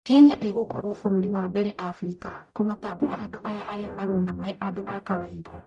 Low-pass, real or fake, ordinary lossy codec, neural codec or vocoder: 10.8 kHz; fake; none; codec, 44.1 kHz, 0.9 kbps, DAC